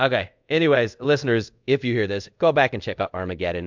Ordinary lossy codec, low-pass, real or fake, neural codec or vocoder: MP3, 64 kbps; 7.2 kHz; fake; codec, 24 kHz, 0.5 kbps, DualCodec